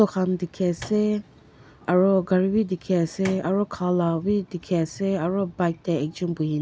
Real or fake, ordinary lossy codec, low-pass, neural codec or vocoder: real; none; none; none